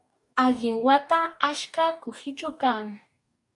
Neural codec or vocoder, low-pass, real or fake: codec, 44.1 kHz, 2.6 kbps, DAC; 10.8 kHz; fake